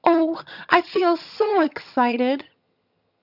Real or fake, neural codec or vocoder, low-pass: fake; vocoder, 22.05 kHz, 80 mel bands, HiFi-GAN; 5.4 kHz